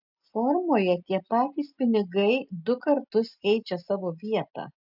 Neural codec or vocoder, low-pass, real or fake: none; 5.4 kHz; real